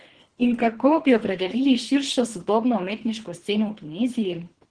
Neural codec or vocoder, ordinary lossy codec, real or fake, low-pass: codec, 24 kHz, 3 kbps, HILCodec; Opus, 16 kbps; fake; 9.9 kHz